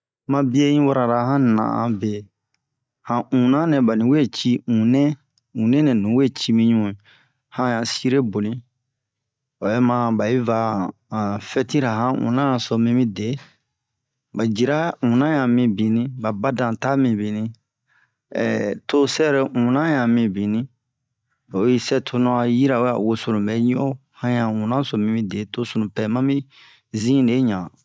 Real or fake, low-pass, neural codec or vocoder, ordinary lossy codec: real; none; none; none